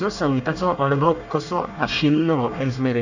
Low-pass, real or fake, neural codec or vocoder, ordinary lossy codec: 7.2 kHz; fake; codec, 24 kHz, 1 kbps, SNAC; none